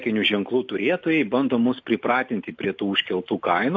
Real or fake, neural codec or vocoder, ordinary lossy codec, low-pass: real; none; AAC, 48 kbps; 7.2 kHz